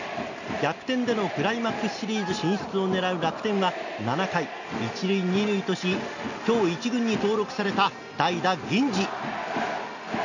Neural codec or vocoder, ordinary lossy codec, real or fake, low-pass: none; none; real; 7.2 kHz